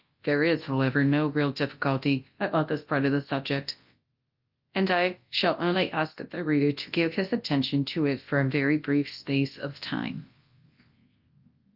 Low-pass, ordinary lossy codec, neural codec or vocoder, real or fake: 5.4 kHz; Opus, 24 kbps; codec, 24 kHz, 0.9 kbps, WavTokenizer, large speech release; fake